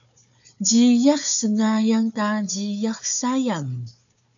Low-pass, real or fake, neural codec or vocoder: 7.2 kHz; fake; codec, 16 kHz, 4 kbps, FunCodec, trained on Chinese and English, 50 frames a second